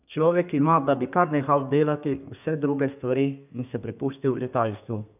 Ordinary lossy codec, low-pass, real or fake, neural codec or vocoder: none; 3.6 kHz; fake; codec, 32 kHz, 1.9 kbps, SNAC